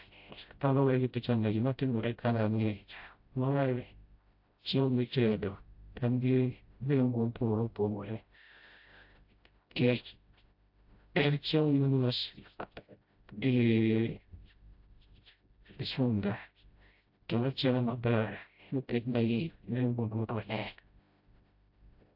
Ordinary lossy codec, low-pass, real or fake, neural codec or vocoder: AAC, 48 kbps; 5.4 kHz; fake; codec, 16 kHz, 0.5 kbps, FreqCodec, smaller model